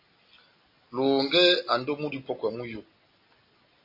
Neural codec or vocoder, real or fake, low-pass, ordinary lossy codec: none; real; 5.4 kHz; MP3, 32 kbps